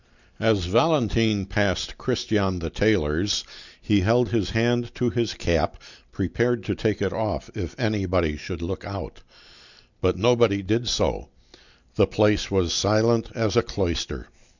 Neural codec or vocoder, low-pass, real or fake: none; 7.2 kHz; real